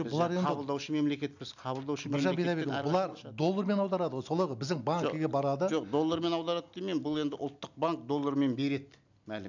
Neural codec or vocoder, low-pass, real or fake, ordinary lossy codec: none; 7.2 kHz; real; none